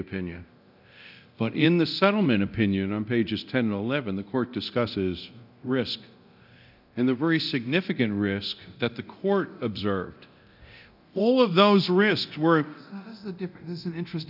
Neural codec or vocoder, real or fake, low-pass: codec, 24 kHz, 0.9 kbps, DualCodec; fake; 5.4 kHz